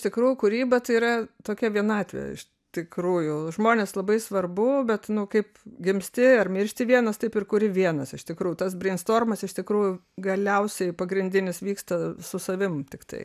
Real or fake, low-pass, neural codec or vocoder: real; 14.4 kHz; none